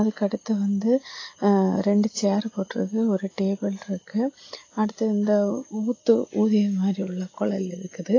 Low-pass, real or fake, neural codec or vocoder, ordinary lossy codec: 7.2 kHz; real; none; AAC, 32 kbps